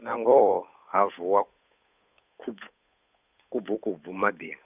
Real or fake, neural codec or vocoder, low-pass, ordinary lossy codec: fake; codec, 16 kHz, 8 kbps, FunCodec, trained on Chinese and English, 25 frames a second; 3.6 kHz; none